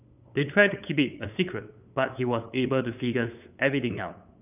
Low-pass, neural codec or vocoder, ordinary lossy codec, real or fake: 3.6 kHz; codec, 16 kHz, 8 kbps, FunCodec, trained on LibriTTS, 25 frames a second; none; fake